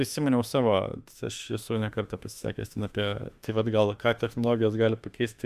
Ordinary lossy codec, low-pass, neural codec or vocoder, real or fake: Opus, 64 kbps; 14.4 kHz; autoencoder, 48 kHz, 32 numbers a frame, DAC-VAE, trained on Japanese speech; fake